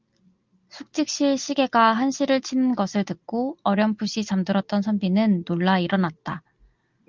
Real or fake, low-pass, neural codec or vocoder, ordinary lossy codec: real; 7.2 kHz; none; Opus, 24 kbps